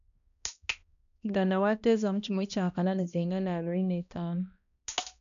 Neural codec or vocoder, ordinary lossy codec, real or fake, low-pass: codec, 16 kHz, 1 kbps, X-Codec, HuBERT features, trained on balanced general audio; none; fake; 7.2 kHz